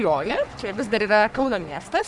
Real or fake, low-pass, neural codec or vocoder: fake; 10.8 kHz; codec, 44.1 kHz, 3.4 kbps, Pupu-Codec